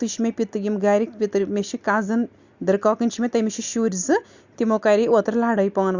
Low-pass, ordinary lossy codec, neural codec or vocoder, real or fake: 7.2 kHz; Opus, 64 kbps; none; real